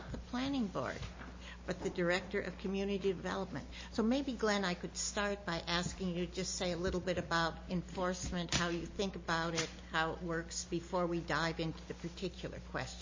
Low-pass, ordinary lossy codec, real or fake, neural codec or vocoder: 7.2 kHz; MP3, 32 kbps; real; none